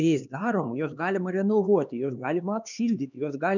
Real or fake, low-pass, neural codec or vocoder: fake; 7.2 kHz; codec, 16 kHz, 2 kbps, X-Codec, HuBERT features, trained on LibriSpeech